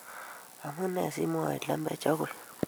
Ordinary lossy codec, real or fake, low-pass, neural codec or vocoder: none; fake; none; vocoder, 44.1 kHz, 128 mel bands every 256 samples, BigVGAN v2